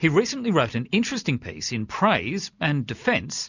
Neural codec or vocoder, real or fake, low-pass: none; real; 7.2 kHz